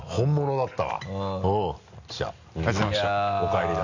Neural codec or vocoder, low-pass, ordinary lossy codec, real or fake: none; 7.2 kHz; none; real